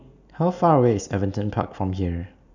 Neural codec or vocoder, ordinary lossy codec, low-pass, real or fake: none; none; 7.2 kHz; real